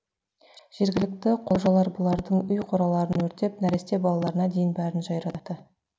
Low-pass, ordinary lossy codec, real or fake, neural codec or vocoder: none; none; real; none